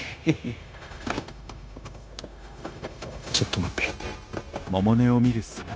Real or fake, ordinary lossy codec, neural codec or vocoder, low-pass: fake; none; codec, 16 kHz, 0.9 kbps, LongCat-Audio-Codec; none